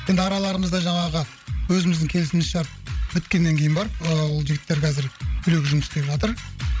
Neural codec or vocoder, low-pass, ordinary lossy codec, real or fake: codec, 16 kHz, 16 kbps, FreqCodec, larger model; none; none; fake